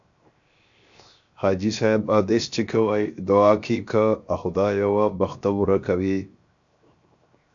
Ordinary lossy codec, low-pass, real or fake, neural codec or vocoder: AAC, 64 kbps; 7.2 kHz; fake; codec, 16 kHz, 0.7 kbps, FocalCodec